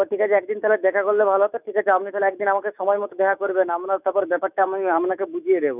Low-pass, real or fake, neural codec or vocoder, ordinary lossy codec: 3.6 kHz; fake; autoencoder, 48 kHz, 128 numbers a frame, DAC-VAE, trained on Japanese speech; none